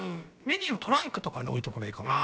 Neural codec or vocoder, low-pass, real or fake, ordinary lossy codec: codec, 16 kHz, about 1 kbps, DyCAST, with the encoder's durations; none; fake; none